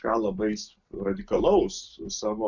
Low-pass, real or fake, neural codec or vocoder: 7.2 kHz; real; none